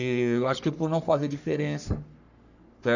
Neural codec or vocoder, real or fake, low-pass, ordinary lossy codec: codec, 44.1 kHz, 3.4 kbps, Pupu-Codec; fake; 7.2 kHz; none